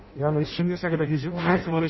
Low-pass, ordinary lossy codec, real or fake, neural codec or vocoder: 7.2 kHz; MP3, 24 kbps; fake; codec, 16 kHz in and 24 kHz out, 0.6 kbps, FireRedTTS-2 codec